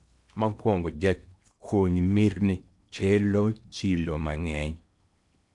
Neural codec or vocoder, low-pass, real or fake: codec, 16 kHz in and 24 kHz out, 0.8 kbps, FocalCodec, streaming, 65536 codes; 10.8 kHz; fake